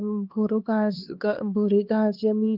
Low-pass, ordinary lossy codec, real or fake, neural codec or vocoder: 5.4 kHz; Opus, 32 kbps; fake; codec, 16 kHz, 2 kbps, X-Codec, HuBERT features, trained on LibriSpeech